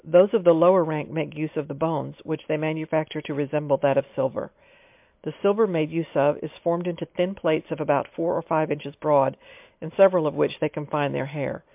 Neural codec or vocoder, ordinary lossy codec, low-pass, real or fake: none; MP3, 32 kbps; 3.6 kHz; real